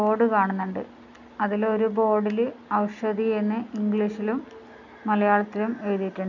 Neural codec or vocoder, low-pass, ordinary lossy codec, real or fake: none; 7.2 kHz; none; real